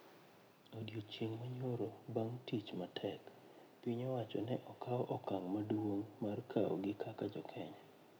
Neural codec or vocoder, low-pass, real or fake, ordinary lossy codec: none; none; real; none